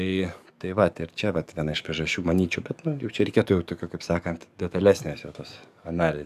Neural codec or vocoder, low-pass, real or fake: codec, 44.1 kHz, 7.8 kbps, DAC; 14.4 kHz; fake